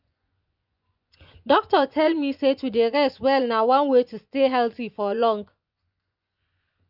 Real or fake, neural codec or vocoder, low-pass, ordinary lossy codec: fake; vocoder, 24 kHz, 100 mel bands, Vocos; 5.4 kHz; none